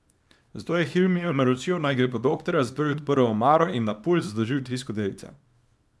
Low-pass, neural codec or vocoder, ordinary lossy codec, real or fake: none; codec, 24 kHz, 0.9 kbps, WavTokenizer, small release; none; fake